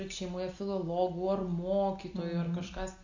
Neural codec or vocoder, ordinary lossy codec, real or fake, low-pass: none; AAC, 48 kbps; real; 7.2 kHz